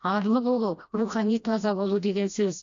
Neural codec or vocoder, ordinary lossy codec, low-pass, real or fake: codec, 16 kHz, 1 kbps, FreqCodec, smaller model; AAC, 64 kbps; 7.2 kHz; fake